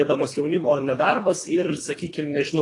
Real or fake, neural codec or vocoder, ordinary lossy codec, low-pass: fake; codec, 24 kHz, 1.5 kbps, HILCodec; AAC, 32 kbps; 10.8 kHz